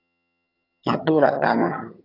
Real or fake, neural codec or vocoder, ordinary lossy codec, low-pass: fake; vocoder, 22.05 kHz, 80 mel bands, HiFi-GAN; AAC, 24 kbps; 5.4 kHz